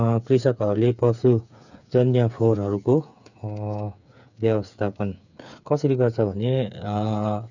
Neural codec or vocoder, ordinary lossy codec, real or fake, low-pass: codec, 16 kHz, 8 kbps, FreqCodec, smaller model; none; fake; 7.2 kHz